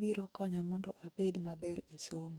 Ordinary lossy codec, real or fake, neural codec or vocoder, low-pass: none; fake; codec, 44.1 kHz, 2.6 kbps, DAC; none